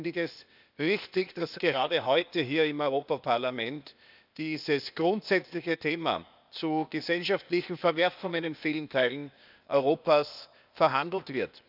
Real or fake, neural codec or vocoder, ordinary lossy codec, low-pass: fake; codec, 16 kHz, 0.8 kbps, ZipCodec; none; 5.4 kHz